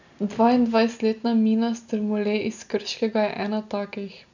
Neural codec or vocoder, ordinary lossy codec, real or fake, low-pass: none; none; real; 7.2 kHz